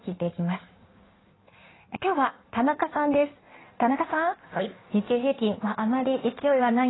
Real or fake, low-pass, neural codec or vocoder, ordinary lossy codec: fake; 7.2 kHz; codec, 16 kHz in and 24 kHz out, 1.1 kbps, FireRedTTS-2 codec; AAC, 16 kbps